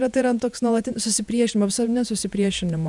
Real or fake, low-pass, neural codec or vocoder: fake; 10.8 kHz; vocoder, 48 kHz, 128 mel bands, Vocos